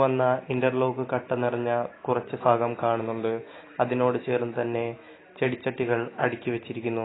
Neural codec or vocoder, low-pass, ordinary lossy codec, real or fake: none; 7.2 kHz; AAC, 16 kbps; real